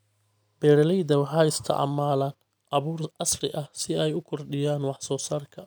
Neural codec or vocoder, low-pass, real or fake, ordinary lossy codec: none; none; real; none